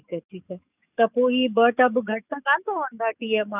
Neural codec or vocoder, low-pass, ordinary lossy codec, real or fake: codec, 44.1 kHz, 7.8 kbps, DAC; 3.6 kHz; none; fake